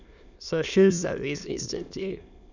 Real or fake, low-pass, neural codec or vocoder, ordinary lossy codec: fake; 7.2 kHz; autoencoder, 22.05 kHz, a latent of 192 numbers a frame, VITS, trained on many speakers; none